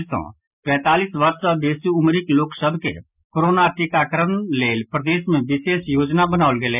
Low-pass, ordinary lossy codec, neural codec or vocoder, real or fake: 3.6 kHz; none; none; real